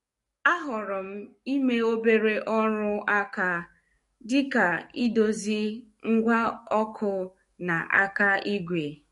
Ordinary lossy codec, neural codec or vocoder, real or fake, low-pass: MP3, 48 kbps; codec, 44.1 kHz, 7.8 kbps, DAC; fake; 14.4 kHz